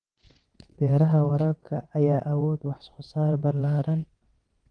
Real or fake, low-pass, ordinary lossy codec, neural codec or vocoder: fake; 9.9 kHz; Opus, 24 kbps; vocoder, 22.05 kHz, 80 mel bands, WaveNeXt